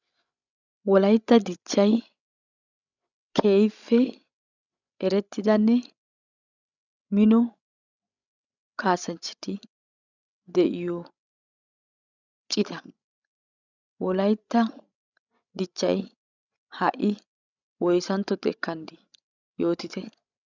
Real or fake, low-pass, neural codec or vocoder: fake; 7.2 kHz; codec, 16 kHz, 16 kbps, FreqCodec, larger model